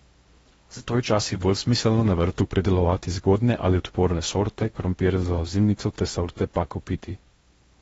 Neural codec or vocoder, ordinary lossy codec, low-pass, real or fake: codec, 16 kHz in and 24 kHz out, 0.6 kbps, FocalCodec, streaming, 4096 codes; AAC, 24 kbps; 10.8 kHz; fake